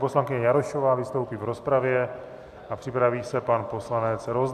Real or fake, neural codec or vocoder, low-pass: real; none; 14.4 kHz